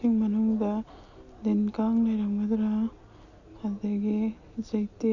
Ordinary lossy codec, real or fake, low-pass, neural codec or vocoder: none; real; 7.2 kHz; none